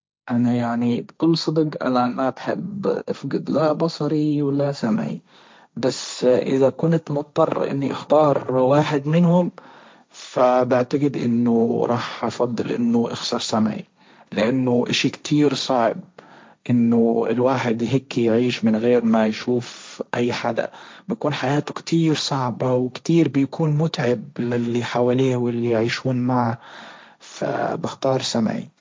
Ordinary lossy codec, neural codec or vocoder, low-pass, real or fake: none; codec, 16 kHz, 1.1 kbps, Voila-Tokenizer; none; fake